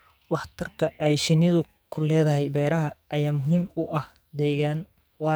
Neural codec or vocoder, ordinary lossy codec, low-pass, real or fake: codec, 44.1 kHz, 2.6 kbps, SNAC; none; none; fake